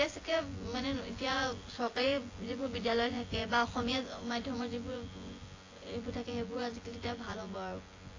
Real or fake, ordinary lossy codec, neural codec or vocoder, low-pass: fake; AAC, 32 kbps; vocoder, 24 kHz, 100 mel bands, Vocos; 7.2 kHz